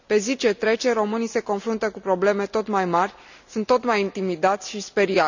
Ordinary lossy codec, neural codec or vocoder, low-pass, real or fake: none; none; 7.2 kHz; real